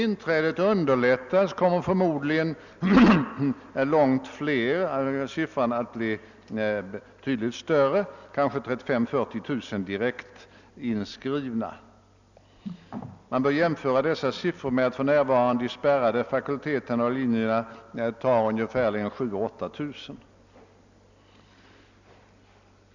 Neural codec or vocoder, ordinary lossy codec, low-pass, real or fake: none; none; 7.2 kHz; real